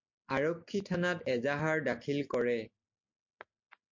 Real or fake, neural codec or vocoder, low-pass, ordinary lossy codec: real; none; 7.2 kHz; MP3, 48 kbps